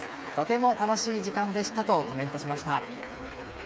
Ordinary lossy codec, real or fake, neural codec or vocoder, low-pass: none; fake; codec, 16 kHz, 4 kbps, FreqCodec, smaller model; none